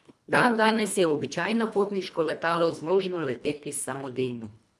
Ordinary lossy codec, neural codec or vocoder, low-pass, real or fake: none; codec, 24 kHz, 1.5 kbps, HILCodec; none; fake